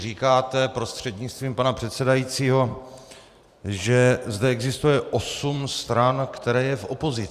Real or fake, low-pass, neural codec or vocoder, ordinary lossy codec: fake; 14.4 kHz; vocoder, 44.1 kHz, 128 mel bands every 512 samples, BigVGAN v2; Opus, 64 kbps